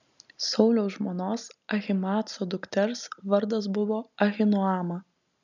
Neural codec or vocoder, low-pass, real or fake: none; 7.2 kHz; real